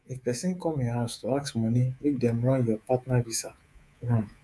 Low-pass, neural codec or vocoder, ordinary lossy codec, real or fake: none; codec, 24 kHz, 3.1 kbps, DualCodec; none; fake